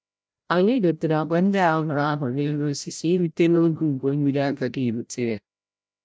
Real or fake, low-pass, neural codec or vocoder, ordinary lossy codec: fake; none; codec, 16 kHz, 0.5 kbps, FreqCodec, larger model; none